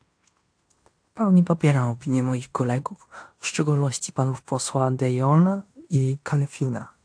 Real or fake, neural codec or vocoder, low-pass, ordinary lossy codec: fake; codec, 16 kHz in and 24 kHz out, 0.9 kbps, LongCat-Audio-Codec, fine tuned four codebook decoder; 9.9 kHz; AAC, 64 kbps